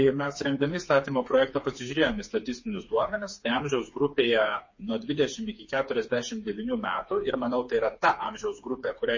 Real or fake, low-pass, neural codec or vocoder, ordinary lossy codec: fake; 7.2 kHz; codec, 16 kHz, 4 kbps, FreqCodec, smaller model; MP3, 32 kbps